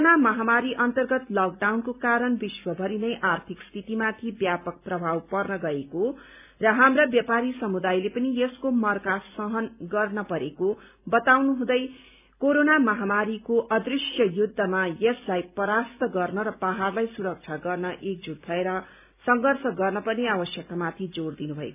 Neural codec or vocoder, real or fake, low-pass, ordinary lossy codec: none; real; 3.6 kHz; none